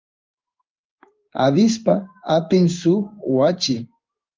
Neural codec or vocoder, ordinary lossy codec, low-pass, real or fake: codec, 16 kHz in and 24 kHz out, 1 kbps, XY-Tokenizer; Opus, 32 kbps; 7.2 kHz; fake